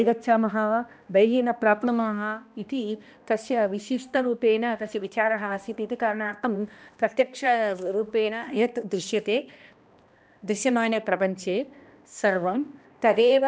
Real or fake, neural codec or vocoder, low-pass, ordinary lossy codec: fake; codec, 16 kHz, 1 kbps, X-Codec, HuBERT features, trained on balanced general audio; none; none